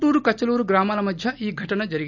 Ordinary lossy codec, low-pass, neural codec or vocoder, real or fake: none; 7.2 kHz; none; real